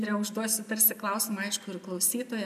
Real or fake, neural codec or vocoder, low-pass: real; none; 14.4 kHz